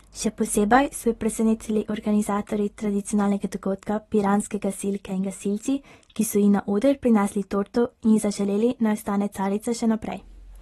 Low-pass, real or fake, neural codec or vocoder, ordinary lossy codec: 19.8 kHz; real; none; AAC, 32 kbps